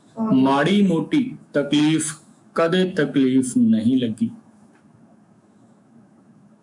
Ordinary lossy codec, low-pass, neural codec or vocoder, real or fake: MP3, 96 kbps; 10.8 kHz; autoencoder, 48 kHz, 128 numbers a frame, DAC-VAE, trained on Japanese speech; fake